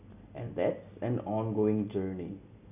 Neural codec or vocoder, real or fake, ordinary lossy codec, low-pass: none; real; none; 3.6 kHz